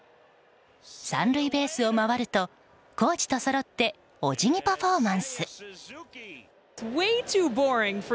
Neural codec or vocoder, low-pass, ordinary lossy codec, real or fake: none; none; none; real